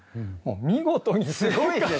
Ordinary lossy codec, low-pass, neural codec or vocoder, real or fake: none; none; none; real